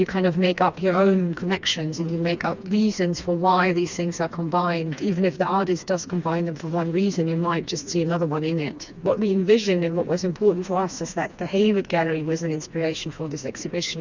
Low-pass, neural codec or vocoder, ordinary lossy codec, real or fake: 7.2 kHz; codec, 16 kHz, 2 kbps, FreqCodec, smaller model; Opus, 64 kbps; fake